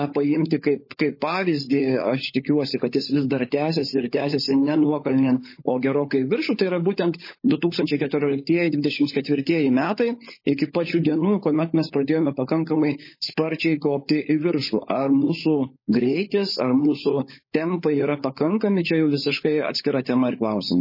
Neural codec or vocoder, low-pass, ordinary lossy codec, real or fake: codec, 16 kHz, 16 kbps, FunCodec, trained on LibriTTS, 50 frames a second; 5.4 kHz; MP3, 24 kbps; fake